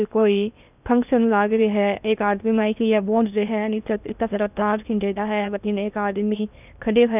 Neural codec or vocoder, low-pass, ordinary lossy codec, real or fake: codec, 16 kHz in and 24 kHz out, 0.8 kbps, FocalCodec, streaming, 65536 codes; 3.6 kHz; none; fake